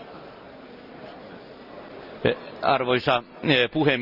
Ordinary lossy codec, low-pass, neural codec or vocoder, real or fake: none; 5.4 kHz; none; real